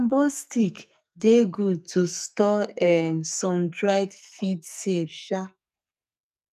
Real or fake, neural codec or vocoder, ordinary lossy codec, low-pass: fake; codec, 44.1 kHz, 2.6 kbps, SNAC; none; 14.4 kHz